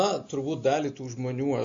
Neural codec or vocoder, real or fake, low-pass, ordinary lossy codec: none; real; 7.2 kHz; MP3, 48 kbps